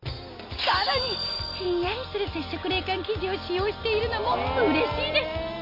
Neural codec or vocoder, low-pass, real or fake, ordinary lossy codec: none; 5.4 kHz; real; MP3, 32 kbps